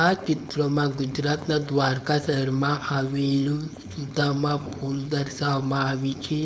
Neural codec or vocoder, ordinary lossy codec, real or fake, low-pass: codec, 16 kHz, 4.8 kbps, FACodec; none; fake; none